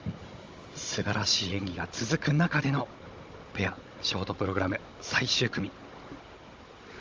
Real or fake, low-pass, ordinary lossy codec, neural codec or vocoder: fake; 7.2 kHz; Opus, 32 kbps; codec, 16 kHz, 16 kbps, FunCodec, trained on Chinese and English, 50 frames a second